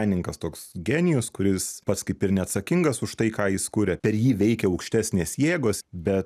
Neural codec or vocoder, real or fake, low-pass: none; real; 14.4 kHz